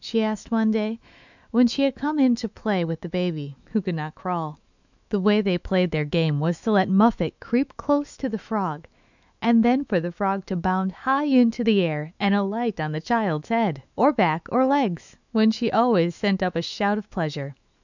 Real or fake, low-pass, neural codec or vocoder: fake; 7.2 kHz; autoencoder, 48 kHz, 128 numbers a frame, DAC-VAE, trained on Japanese speech